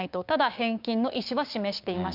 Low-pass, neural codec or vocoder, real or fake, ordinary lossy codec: 5.4 kHz; codec, 16 kHz, 6 kbps, DAC; fake; none